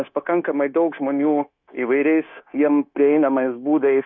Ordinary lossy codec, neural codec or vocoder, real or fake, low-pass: MP3, 48 kbps; codec, 16 kHz, 0.9 kbps, LongCat-Audio-Codec; fake; 7.2 kHz